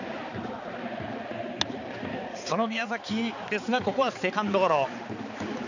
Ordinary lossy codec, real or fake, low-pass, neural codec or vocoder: none; fake; 7.2 kHz; codec, 16 kHz, 4 kbps, X-Codec, HuBERT features, trained on balanced general audio